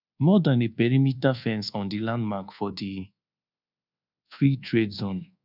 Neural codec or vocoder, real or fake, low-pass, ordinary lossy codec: codec, 24 kHz, 1.2 kbps, DualCodec; fake; 5.4 kHz; none